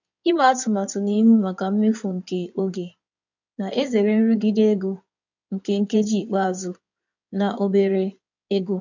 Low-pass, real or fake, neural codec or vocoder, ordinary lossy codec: 7.2 kHz; fake; codec, 16 kHz in and 24 kHz out, 2.2 kbps, FireRedTTS-2 codec; none